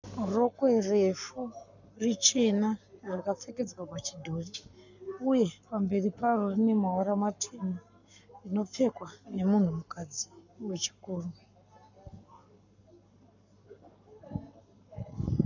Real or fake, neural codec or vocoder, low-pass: fake; codec, 44.1 kHz, 7.8 kbps, Pupu-Codec; 7.2 kHz